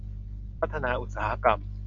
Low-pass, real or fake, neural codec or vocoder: 7.2 kHz; real; none